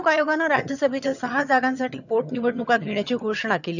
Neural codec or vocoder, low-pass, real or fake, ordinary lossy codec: vocoder, 22.05 kHz, 80 mel bands, HiFi-GAN; 7.2 kHz; fake; none